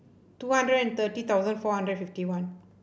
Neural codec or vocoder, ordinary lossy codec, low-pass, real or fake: none; none; none; real